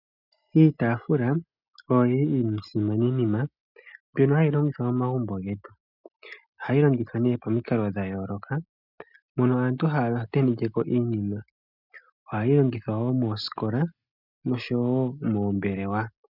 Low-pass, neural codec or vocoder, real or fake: 5.4 kHz; none; real